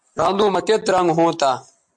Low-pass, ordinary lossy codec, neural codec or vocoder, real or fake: 10.8 kHz; MP3, 64 kbps; none; real